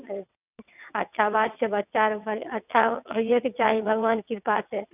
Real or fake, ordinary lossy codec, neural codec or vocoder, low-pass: fake; none; vocoder, 22.05 kHz, 80 mel bands, WaveNeXt; 3.6 kHz